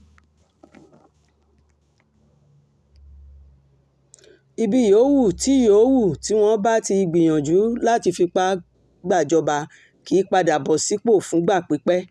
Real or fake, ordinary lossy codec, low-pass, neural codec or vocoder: real; none; none; none